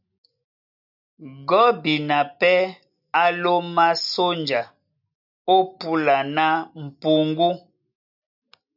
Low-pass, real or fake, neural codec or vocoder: 5.4 kHz; real; none